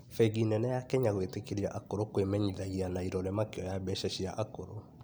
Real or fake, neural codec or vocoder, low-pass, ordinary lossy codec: real; none; none; none